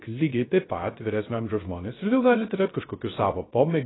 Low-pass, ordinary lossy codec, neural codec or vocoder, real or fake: 7.2 kHz; AAC, 16 kbps; codec, 16 kHz, 0.3 kbps, FocalCodec; fake